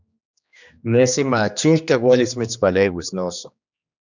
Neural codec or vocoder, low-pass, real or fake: codec, 16 kHz, 2 kbps, X-Codec, HuBERT features, trained on general audio; 7.2 kHz; fake